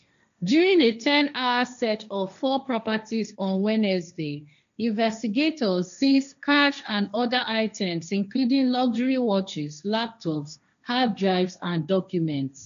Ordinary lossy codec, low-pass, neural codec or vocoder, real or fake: none; 7.2 kHz; codec, 16 kHz, 1.1 kbps, Voila-Tokenizer; fake